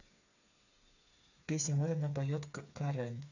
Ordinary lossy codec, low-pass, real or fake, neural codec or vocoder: none; 7.2 kHz; fake; codec, 16 kHz, 4 kbps, FreqCodec, smaller model